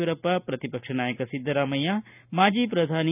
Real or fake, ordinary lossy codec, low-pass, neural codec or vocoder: real; none; 3.6 kHz; none